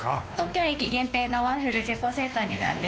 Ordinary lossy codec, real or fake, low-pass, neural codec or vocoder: none; fake; none; codec, 16 kHz, 2 kbps, X-Codec, WavLM features, trained on Multilingual LibriSpeech